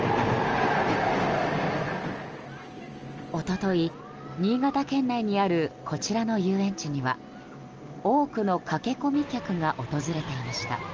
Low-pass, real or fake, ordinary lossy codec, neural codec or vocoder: 7.2 kHz; real; Opus, 24 kbps; none